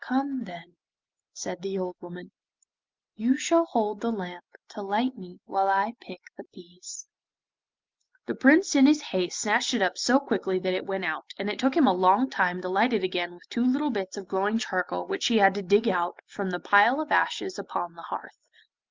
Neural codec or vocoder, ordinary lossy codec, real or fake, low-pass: none; Opus, 32 kbps; real; 7.2 kHz